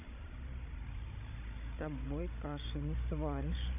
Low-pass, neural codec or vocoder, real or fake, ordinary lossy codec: 3.6 kHz; codec, 16 kHz, 16 kbps, FreqCodec, larger model; fake; none